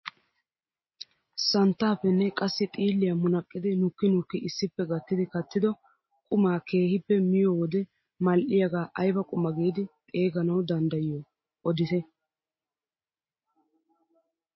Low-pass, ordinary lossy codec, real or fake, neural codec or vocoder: 7.2 kHz; MP3, 24 kbps; real; none